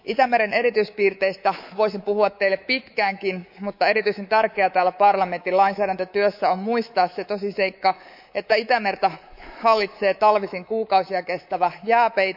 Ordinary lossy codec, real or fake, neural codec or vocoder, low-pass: none; fake; codec, 24 kHz, 3.1 kbps, DualCodec; 5.4 kHz